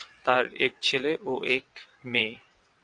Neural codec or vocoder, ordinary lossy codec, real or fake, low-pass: vocoder, 22.05 kHz, 80 mel bands, WaveNeXt; AAC, 64 kbps; fake; 9.9 kHz